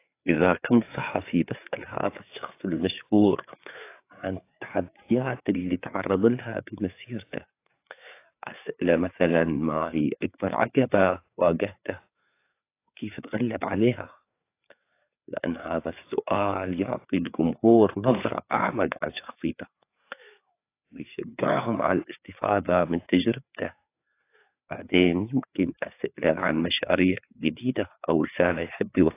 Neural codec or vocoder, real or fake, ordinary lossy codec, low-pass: codec, 16 kHz, 4 kbps, FreqCodec, larger model; fake; AAC, 24 kbps; 3.6 kHz